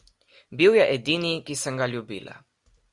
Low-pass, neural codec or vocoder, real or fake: 10.8 kHz; none; real